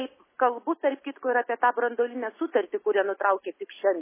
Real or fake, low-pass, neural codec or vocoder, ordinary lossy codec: real; 3.6 kHz; none; MP3, 16 kbps